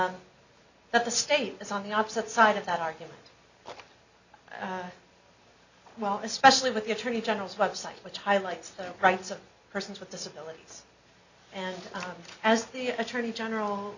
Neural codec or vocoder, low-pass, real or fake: none; 7.2 kHz; real